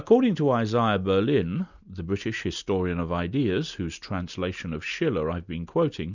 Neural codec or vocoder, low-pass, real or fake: none; 7.2 kHz; real